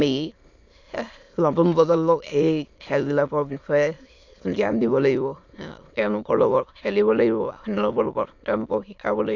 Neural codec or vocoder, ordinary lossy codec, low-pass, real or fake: autoencoder, 22.05 kHz, a latent of 192 numbers a frame, VITS, trained on many speakers; AAC, 48 kbps; 7.2 kHz; fake